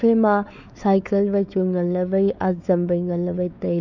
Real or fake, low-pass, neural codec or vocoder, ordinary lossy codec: fake; 7.2 kHz; codec, 16 kHz, 4 kbps, X-Codec, WavLM features, trained on Multilingual LibriSpeech; none